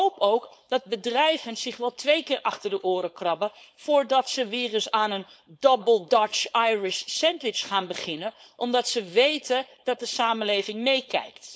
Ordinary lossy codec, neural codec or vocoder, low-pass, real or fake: none; codec, 16 kHz, 4.8 kbps, FACodec; none; fake